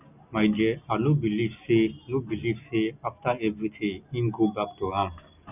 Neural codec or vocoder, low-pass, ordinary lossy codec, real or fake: none; 3.6 kHz; none; real